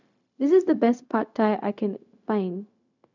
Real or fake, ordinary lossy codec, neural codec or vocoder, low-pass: fake; none; codec, 16 kHz, 0.4 kbps, LongCat-Audio-Codec; 7.2 kHz